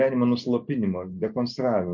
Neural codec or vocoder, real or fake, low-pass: none; real; 7.2 kHz